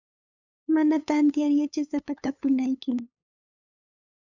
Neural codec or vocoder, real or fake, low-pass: codec, 16 kHz, 8 kbps, FunCodec, trained on LibriTTS, 25 frames a second; fake; 7.2 kHz